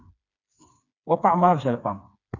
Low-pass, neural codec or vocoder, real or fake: 7.2 kHz; codec, 16 kHz, 4 kbps, FreqCodec, smaller model; fake